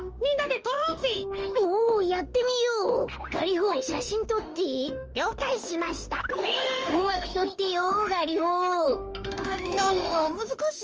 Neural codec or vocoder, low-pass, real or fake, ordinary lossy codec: autoencoder, 48 kHz, 32 numbers a frame, DAC-VAE, trained on Japanese speech; 7.2 kHz; fake; Opus, 24 kbps